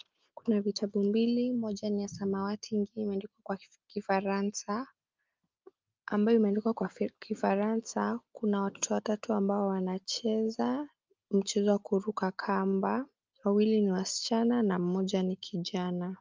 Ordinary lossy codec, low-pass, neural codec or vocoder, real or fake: Opus, 24 kbps; 7.2 kHz; none; real